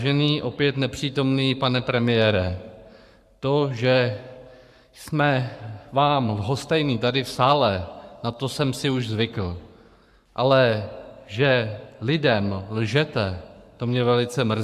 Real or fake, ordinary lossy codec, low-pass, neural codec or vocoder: fake; AAC, 96 kbps; 14.4 kHz; codec, 44.1 kHz, 7.8 kbps, Pupu-Codec